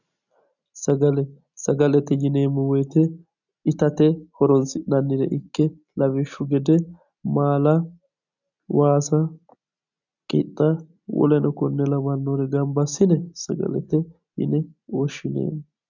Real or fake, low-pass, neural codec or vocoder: real; 7.2 kHz; none